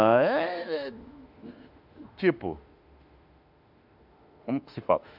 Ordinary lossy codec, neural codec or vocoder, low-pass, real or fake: none; autoencoder, 48 kHz, 32 numbers a frame, DAC-VAE, trained on Japanese speech; 5.4 kHz; fake